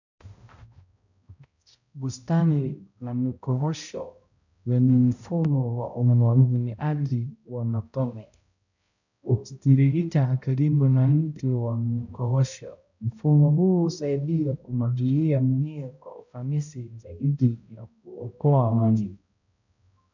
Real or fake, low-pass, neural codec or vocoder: fake; 7.2 kHz; codec, 16 kHz, 0.5 kbps, X-Codec, HuBERT features, trained on balanced general audio